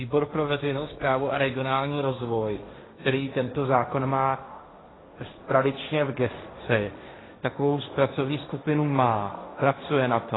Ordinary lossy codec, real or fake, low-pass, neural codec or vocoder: AAC, 16 kbps; fake; 7.2 kHz; codec, 16 kHz, 1.1 kbps, Voila-Tokenizer